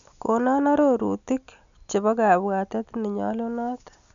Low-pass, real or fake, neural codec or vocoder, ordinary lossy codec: 7.2 kHz; real; none; none